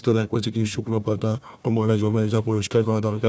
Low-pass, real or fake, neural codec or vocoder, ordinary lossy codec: none; fake; codec, 16 kHz, 1 kbps, FunCodec, trained on Chinese and English, 50 frames a second; none